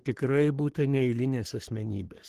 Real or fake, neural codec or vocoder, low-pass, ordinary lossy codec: fake; codec, 44.1 kHz, 3.4 kbps, Pupu-Codec; 14.4 kHz; Opus, 16 kbps